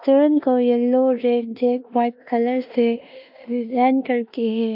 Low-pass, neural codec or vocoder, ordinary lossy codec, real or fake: 5.4 kHz; codec, 16 kHz in and 24 kHz out, 0.9 kbps, LongCat-Audio-Codec, four codebook decoder; AAC, 48 kbps; fake